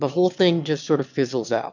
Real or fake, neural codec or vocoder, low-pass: fake; autoencoder, 22.05 kHz, a latent of 192 numbers a frame, VITS, trained on one speaker; 7.2 kHz